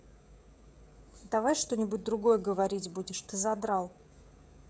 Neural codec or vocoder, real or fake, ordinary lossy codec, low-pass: codec, 16 kHz, 16 kbps, FreqCodec, smaller model; fake; none; none